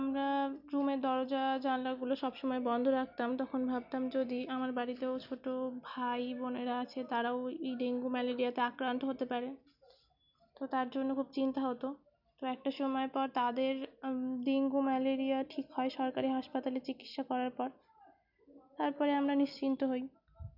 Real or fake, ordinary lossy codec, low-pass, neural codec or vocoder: real; none; 5.4 kHz; none